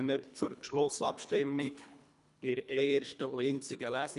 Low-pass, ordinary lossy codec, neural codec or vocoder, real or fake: 10.8 kHz; none; codec, 24 kHz, 1.5 kbps, HILCodec; fake